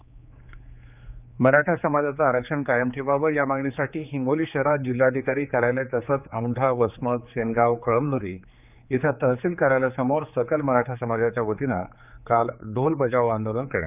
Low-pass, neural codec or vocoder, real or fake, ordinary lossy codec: 3.6 kHz; codec, 16 kHz, 4 kbps, X-Codec, HuBERT features, trained on general audio; fake; none